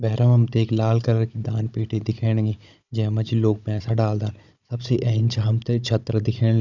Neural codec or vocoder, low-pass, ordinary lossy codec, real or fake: codec, 16 kHz, 16 kbps, FunCodec, trained on Chinese and English, 50 frames a second; 7.2 kHz; none; fake